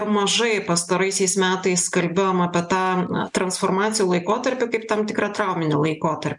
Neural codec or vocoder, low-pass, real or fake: none; 10.8 kHz; real